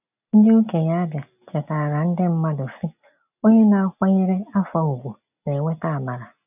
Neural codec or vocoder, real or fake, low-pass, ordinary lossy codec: none; real; 3.6 kHz; none